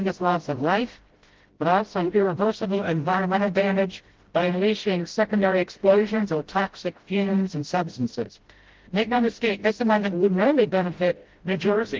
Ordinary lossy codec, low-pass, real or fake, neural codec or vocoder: Opus, 16 kbps; 7.2 kHz; fake; codec, 16 kHz, 0.5 kbps, FreqCodec, smaller model